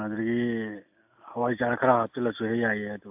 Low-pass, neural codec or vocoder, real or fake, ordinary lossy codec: 3.6 kHz; none; real; AAC, 32 kbps